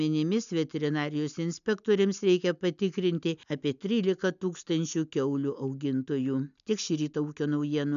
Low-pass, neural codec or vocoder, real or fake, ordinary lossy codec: 7.2 kHz; none; real; MP3, 96 kbps